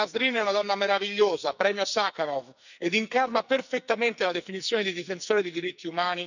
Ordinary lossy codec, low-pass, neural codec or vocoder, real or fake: none; 7.2 kHz; codec, 44.1 kHz, 2.6 kbps, SNAC; fake